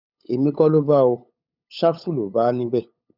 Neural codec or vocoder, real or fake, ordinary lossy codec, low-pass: codec, 16 kHz, 8 kbps, FunCodec, trained on LibriTTS, 25 frames a second; fake; none; 5.4 kHz